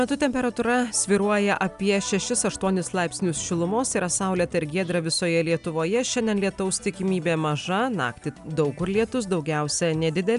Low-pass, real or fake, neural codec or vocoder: 10.8 kHz; real; none